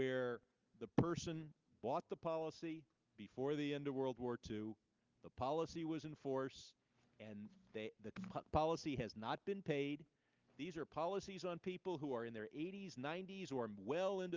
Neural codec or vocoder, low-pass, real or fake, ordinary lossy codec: none; 7.2 kHz; real; Opus, 24 kbps